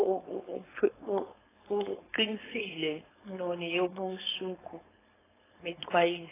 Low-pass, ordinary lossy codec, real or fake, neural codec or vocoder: 3.6 kHz; AAC, 16 kbps; fake; codec, 16 kHz, 4.8 kbps, FACodec